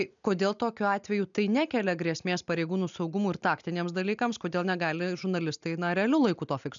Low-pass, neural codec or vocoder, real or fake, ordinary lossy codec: 7.2 kHz; none; real; MP3, 96 kbps